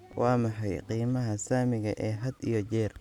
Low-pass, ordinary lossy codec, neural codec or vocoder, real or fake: 19.8 kHz; none; none; real